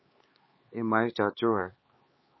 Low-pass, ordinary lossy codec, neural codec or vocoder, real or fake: 7.2 kHz; MP3, 24 kbps; codec, 16 kHz, 4 kbps, X-Codec, HuBERT features, trained on LibriSpeech; fake